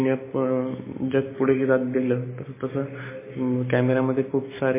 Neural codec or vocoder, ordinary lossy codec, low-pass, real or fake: none; MP3, 16 kbps; 3.6 kHz; real